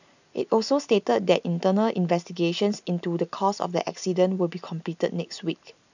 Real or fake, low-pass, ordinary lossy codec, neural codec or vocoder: real; 7.2 kHz; none; none